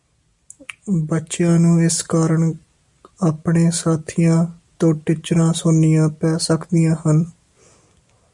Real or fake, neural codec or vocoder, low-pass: real; none; 10.8 kHz